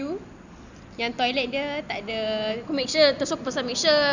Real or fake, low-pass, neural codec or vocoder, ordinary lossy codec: real; 7.2 kHz; none; Opus, 64 kbps